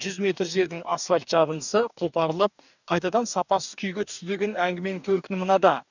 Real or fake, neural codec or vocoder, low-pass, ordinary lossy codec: fake; codec, 44.1 kHz, 2.6 kbps, DAC; 7.2 kHz; none